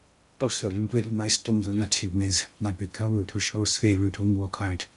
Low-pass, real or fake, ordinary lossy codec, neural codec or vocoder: 10.8 kHz; fake; none; codec, 16 kHz in and 24 kHz out, 0.6 kbps, FocalCodec, streaming, 2048 codes